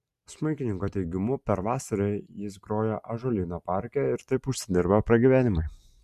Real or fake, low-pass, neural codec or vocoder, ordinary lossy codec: real; 14.4 kHz; none; MP3, 96 kbps